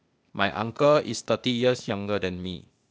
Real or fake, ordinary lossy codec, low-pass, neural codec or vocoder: fake; none; none; codec, 16 kHz, 0.8 kbps, ZipCodec